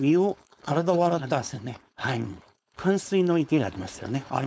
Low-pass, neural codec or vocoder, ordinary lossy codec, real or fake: none; codec, 16 kHz, 4.8 kbps, FACodec; none; fake